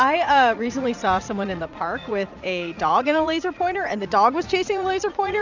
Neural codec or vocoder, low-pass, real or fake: vocoder, 44.1 kHz, 80 mel bands, Vocos; 7.2 kHz; fake